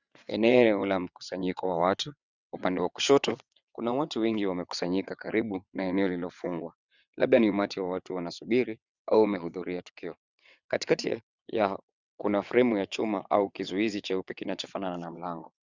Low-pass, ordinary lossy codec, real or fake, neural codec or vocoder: 7.2 kHz; Opus, 64 kbps; fake; vocoder, 22.05 kHz, 80 mel bands, WaveNeXt